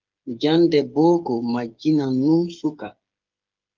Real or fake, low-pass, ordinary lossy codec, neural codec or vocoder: fake; 7.2 kHz; Opus, 24 kbps; codec, 16 kHz, 8 kbps, FreqCodec, smaller model